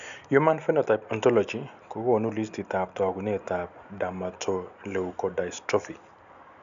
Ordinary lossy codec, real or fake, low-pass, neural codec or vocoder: none; real; 7.2 kHz; none